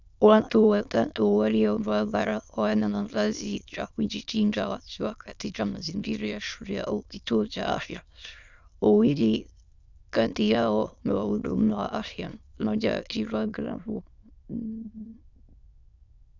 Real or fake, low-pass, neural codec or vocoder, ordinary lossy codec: fake; 7.2 kHz; autoencoder, 22.05 kHz, a latent of 192 numbers a frame, VITS, trained on many speakers; Opus, 64 kbps